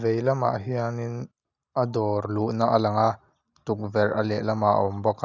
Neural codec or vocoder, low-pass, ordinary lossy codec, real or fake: none; 7.2 kHz; none; real